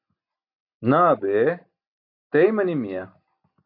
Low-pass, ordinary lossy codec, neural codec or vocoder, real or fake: 5.4 kHz; AAC, 48 kbps; none; real